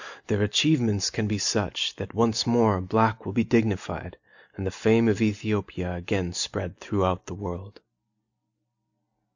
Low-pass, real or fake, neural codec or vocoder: 7.2 kHz; real; none